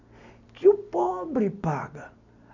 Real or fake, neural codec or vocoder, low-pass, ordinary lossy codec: real; none; 7.2 kHz; none